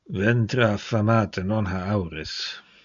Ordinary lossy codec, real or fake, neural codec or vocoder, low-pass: Opus, 64 kbps; real; none; 7.2 kHz